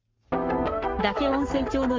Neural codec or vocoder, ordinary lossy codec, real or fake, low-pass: codec, 44.1 kHz, 7.8 kbps, Pupu-Codec; Opus, 32 kbps; fake; 7.2 kHz